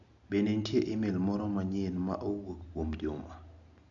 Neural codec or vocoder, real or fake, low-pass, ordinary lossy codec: none; real; 7.2 kHz; none